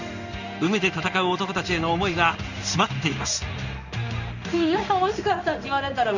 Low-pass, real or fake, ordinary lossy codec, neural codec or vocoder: 7.2 kHz; fake; none; codec, 16 kHz in and 24 kHz out, 1 kbps, XY-Tokenizer